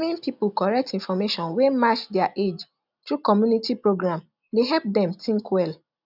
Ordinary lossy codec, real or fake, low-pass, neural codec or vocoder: none; real; 5.4 kHz; none